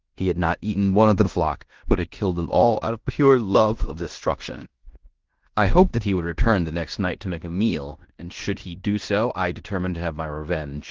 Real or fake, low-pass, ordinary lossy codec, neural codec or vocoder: fake; 7.2 kHz; Opus, 16 kbps; codec, 16 kHz in and 24 kHz out, 0.9 kbps, LongCat-Audio-Codec, fine tuned four codebook decoder